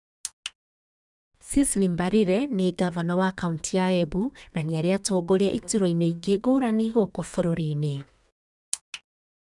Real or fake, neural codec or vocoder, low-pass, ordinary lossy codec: fake; codec, 32 kHz, 1.9 kbps, SNAC; 10.8 kHz; none